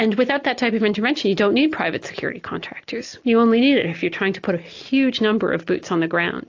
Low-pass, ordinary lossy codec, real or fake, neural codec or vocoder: 7.2 kHz; AAC, 48 kbps; real; none